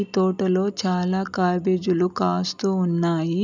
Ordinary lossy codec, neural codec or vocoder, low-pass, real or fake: none; none; 7.2 kHz; real